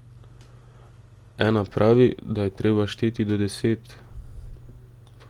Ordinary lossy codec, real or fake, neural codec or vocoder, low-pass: Opus, 24 kbps; real; none; 19.8 kHz